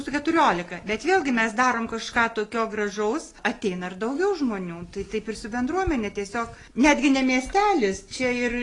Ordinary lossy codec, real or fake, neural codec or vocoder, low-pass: AAC, 32 kbps; real; none; 10.8 kHz